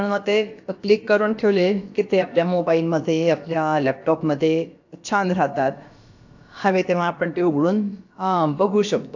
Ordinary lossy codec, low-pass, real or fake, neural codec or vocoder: AAC, 48 kbps; 7.2 kHz; fake; codec, 16 kHz, about 1 kbps, DyCAST, with the encoder's durations